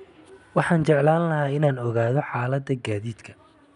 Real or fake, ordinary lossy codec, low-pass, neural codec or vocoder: real; none; 10.8 kHz; none